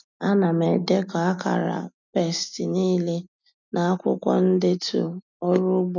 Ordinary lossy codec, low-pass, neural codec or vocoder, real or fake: none; 7.2 kHz; none; real